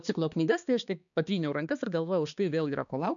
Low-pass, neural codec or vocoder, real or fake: 7.2 kHz; codec, 16 kHz, 2 kbps, X-Codec, HuBERT features, trained on balanced general audio; fake